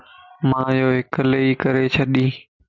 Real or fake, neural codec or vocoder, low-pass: real; none; 7.2 kHz